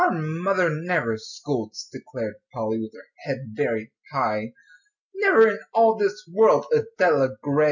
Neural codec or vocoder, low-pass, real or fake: none; 7.2 kHz; real